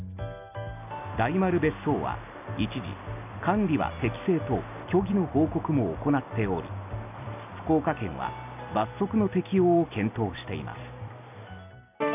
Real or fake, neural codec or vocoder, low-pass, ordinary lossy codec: real; none; 3.6 kHz; none